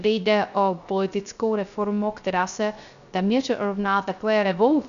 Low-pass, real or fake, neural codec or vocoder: 7.2 kHz; fake; codec, 16 kHz, 0.3 kbps, FocalCodec